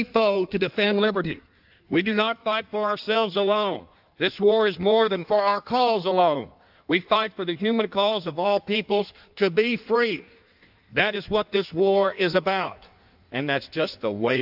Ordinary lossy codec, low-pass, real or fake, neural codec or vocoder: AAC, 48 kbps; 5.4 kHz; fake; codec, 16 kHz in and 24 kHz out, 1.1 kbps, FireRedTTS-2 codec